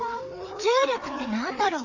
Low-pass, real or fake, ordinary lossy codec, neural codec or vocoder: 7.2 kHz; fake; AAC, 48 kbps; codec, 16 kHz, 4 kbps, FreqCodec, larger model